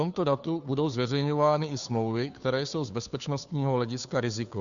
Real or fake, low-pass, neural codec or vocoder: fake; 7.2 kHz; codec, 16 kHz, 2 kbps, FunCodec, trained on Chinese and English, 25 frames a second